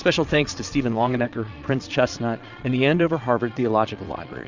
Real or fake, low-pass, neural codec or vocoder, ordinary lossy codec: fake; 7.2 kHz; vocoder, 22.05 kHz, 80 mel bands, Vocos; Opus, 64 kbps